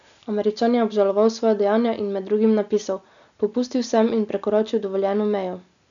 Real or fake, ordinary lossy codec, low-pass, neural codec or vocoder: real; none; 7.2 kHz; none